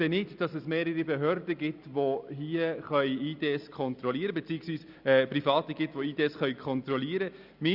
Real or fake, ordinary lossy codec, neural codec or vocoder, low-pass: real; Opus, 64 kbps; none; 5.4 kHz